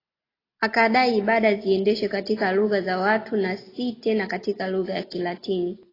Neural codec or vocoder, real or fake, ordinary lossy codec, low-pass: none; real; AAC, 24 kbps; 5.4 kHz